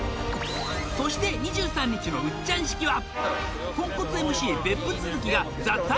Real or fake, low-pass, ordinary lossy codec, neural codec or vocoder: real; none; none; none